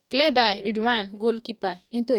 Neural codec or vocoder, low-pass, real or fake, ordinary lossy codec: codec, 44.1 kHz, 2.6 kbps, DAC; 19.8 kHz; fake; none